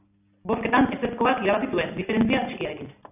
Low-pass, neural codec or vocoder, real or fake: 3.6 kHz; none; real